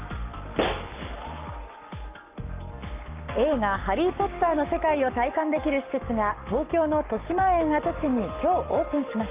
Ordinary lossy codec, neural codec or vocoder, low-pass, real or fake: Opus, 24 kbps; codec, 44.1 kHz, 7.8 kbps, Pupu-Codec; 3.6 kHz; fake